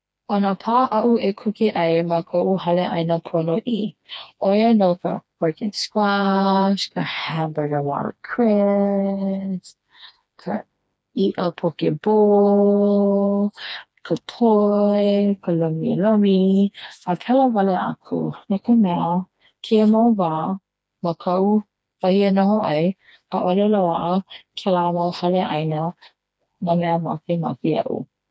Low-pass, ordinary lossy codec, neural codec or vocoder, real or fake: none; none; codec, 16 kHz, 2 kbps, FreqCodec, smaller model; fake